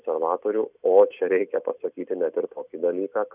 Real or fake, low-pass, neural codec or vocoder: real; 3.6 kHz; none